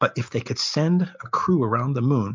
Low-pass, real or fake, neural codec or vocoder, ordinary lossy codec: 7.2 kHz; real; none; MP3, 64 kbps